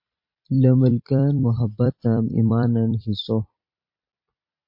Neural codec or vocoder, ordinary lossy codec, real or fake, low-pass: vocoder, 44.1 kHz, 128 mel bands every 256 samples, BigVGAN v2; MP3, 32 kbps; fake; 5.4 kHz